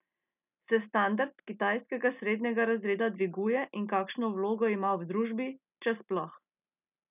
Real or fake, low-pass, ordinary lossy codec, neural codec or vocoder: real; 3.6 kHz; none; none